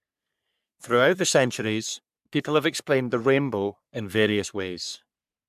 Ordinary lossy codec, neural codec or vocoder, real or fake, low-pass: MP3, 96 kbps; codec, 44.1 kHz, 3.4 kbps, Pupu-Codec; fake; 14.4 kHz